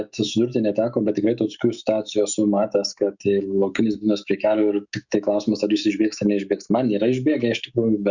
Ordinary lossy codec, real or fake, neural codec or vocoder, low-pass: Opus, 64 kbps; fake; autoencoder, 48 kHz, 128 numbers a frame, DAC-VAE, trained on Japanese speech; 7.2 kHz